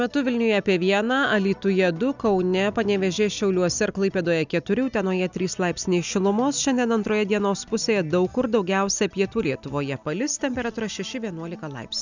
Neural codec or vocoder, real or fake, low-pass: none; real; 7.2 kHz